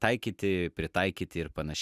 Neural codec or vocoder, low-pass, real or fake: none; 14.4 kHz; real